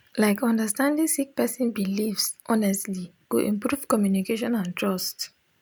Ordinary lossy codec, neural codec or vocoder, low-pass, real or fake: none; none; none; real